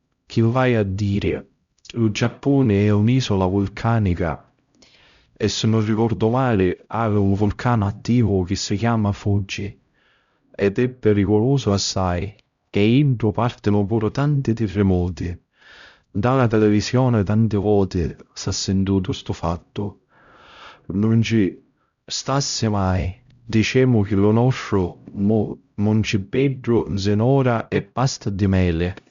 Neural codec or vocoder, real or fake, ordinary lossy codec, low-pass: codec, 16 kHz, 0.5 kbps, X-Codec, HuBERT features, trained on LibriSpeech; fake; Opus, 64 kbps; 7.2 kHz